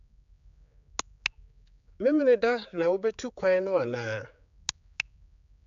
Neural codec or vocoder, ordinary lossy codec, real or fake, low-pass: codec, 16 kHz, 4 kbps, X-Codec, HuBERT features, trained on general audio; AAC, 96 kbps; fake; 7.2 kHz